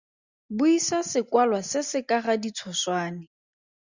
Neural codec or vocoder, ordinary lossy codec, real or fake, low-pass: none; Opus, 64 kbps; real; 7.2 kHz